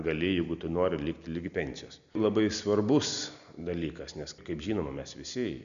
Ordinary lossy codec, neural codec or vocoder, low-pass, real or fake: MP3, 96 kbps; none; 7.2 kHz; real